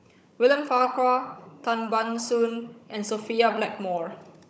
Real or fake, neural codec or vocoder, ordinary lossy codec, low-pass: fake; codec, 16 kHz, 16 kbps, FunCodec, trained on Chinese and English, 50 frames a second; none; none